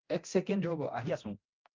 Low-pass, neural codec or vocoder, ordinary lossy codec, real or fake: 7.2 kHz; codec, 24 kHz, 0.9 kbps, DualCodec; Opus, 24 kbps; fake